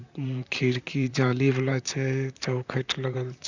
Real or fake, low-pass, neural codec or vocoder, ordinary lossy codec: fake; 7.2 kHz; vocoder, 44.1 kHz, 128 mel bands, Pupu-Vocoder; none